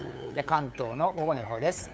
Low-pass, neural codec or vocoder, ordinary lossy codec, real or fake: none; codec, 16 kHz, 8 kbps, FunCodec, trained on LibriTTS, 25 frames a second; none; fake